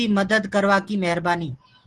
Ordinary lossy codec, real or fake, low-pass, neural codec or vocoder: Opus, 16 kbps; real; 10.8 kHz; none